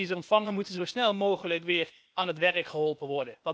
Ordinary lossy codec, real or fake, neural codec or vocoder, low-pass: none; fake; codec, 16 kHz, 0.8 kbps, ZipCodec; none